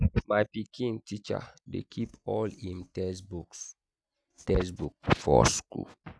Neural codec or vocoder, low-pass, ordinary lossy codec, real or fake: none; none; none; real